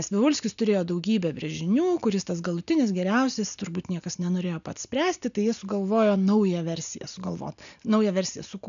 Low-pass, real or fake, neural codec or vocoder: 7.2 kHz; real; none